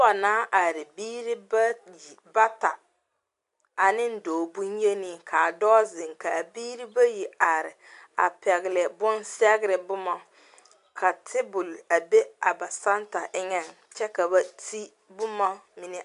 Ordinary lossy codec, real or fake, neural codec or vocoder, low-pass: AAC, 64 kbps; real; none; 10.8 kHz